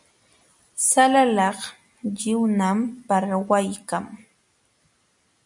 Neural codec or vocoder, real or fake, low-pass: none; real; 10.8 kHz